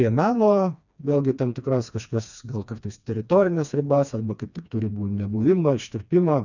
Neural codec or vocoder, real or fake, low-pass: codec, 16 kHz, 2 kbps, FreqCodec, smaller model; fake; 7.2 kHz